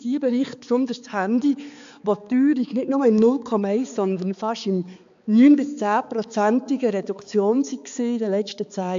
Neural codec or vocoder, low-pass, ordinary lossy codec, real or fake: codec, 16 kHz, 4 kbps, X-Codec, HuBERT features, trained on balanced general audio; 7.2 kHz; AAC, 64 kbps; fake